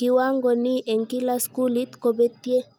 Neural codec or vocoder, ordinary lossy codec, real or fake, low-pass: none; none; real; none